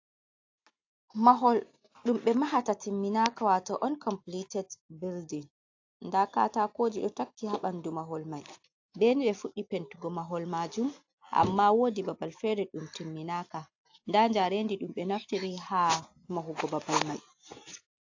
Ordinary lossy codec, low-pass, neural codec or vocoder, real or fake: AAC, 48 kbps; 7.2 kHz; none; real